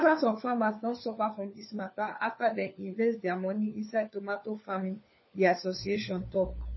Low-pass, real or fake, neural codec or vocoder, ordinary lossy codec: 7.2 kHz; fake; codec, 16 kHz, 4 kbps, FunCodec, trained on LibriTTS, 50 frames a second; MP3, 24 kbps